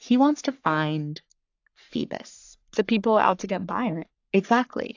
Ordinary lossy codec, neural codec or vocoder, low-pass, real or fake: AAC, 48 kbps; codec, 44.1 kHz, 3.4 kbps, Pupu-Codec; 7.2 kHz; fake